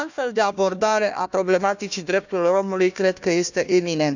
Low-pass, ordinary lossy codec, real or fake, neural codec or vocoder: 7.2 kHz; none; fake; codec, 16 kHz, 1 kbps, FunCodec, trained on Chinese and English, 50 frames a second